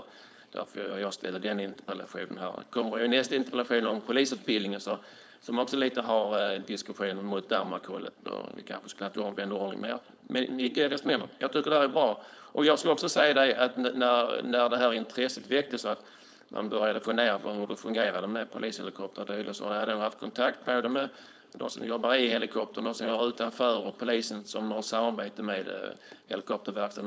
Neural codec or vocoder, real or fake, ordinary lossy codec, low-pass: codec, 16 kHz, 4.8 kbps, FACodec; fake; none; none